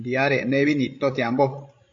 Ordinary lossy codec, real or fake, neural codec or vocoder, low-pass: AAC, 48 kbps; fake; codec, 16 kHz, 16 kbps, FreqCodec, larger model; 7.2 kHz